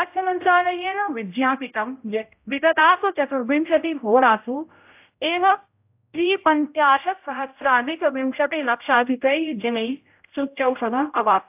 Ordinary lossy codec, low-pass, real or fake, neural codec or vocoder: AAC, 32 kbps; 3.6 kHz; fake; codec, 16 kHz, 0.5 kbps, X-Codec, HuBERT features, trained on general audio